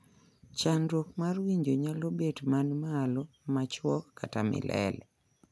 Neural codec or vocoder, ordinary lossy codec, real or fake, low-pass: none; none; real; none